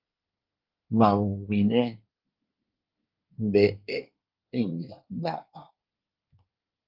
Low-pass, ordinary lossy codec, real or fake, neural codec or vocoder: 5.4 kHz; Opus, 32 kbps; fake; codec, 24 kHz, 1 kbps, SNAC